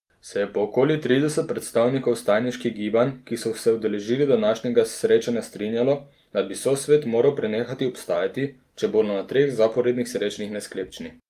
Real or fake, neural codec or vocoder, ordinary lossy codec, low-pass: real; none; Opus, 32 kbps; 14.4 kHz